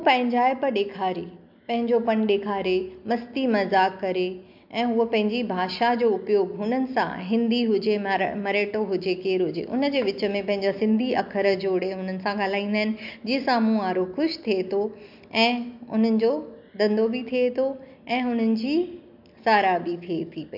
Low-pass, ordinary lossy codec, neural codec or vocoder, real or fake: 5.4 kHz; MP3, 48 kbps; none; real